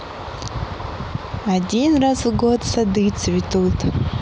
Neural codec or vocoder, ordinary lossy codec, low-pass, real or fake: none; none; none; real